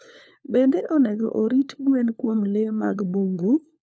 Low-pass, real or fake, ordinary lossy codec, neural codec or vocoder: none; fake; none; codec, 16 kHz, 4 kbps, FunCodec, trained on LibriTTS, 50 frames a second